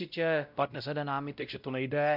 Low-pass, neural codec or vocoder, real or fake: 5.4 kHz; codec, 16 kHz, 0.5 kbps, X-Codec, WavLM features, trained on Multilingual LibriSpeech; fake